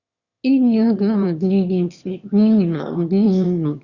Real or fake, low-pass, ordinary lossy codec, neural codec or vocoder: fake; 7.2 kHz; Opus, 64 kbps; autoencoder, 22.05 kHz, a latent of 192 numbers a frame, VITS, trained on one speaker